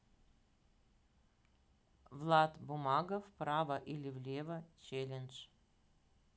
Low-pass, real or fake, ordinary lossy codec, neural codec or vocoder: none; real; none; none